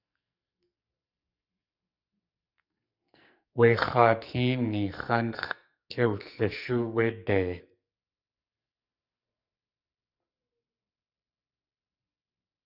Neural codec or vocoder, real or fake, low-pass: codec, 44.1 kHz, 2.6 kbps, SNAC; fake; 5.4 kHz